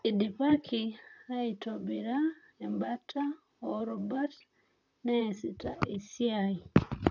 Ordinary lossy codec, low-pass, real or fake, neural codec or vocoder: none; 7.2 kHz; fake; vocoder, 44.1 kHz, 128 mel bands, Pupu-Vocoder